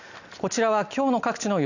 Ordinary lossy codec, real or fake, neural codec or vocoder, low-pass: none; real; none; 7.2 kHz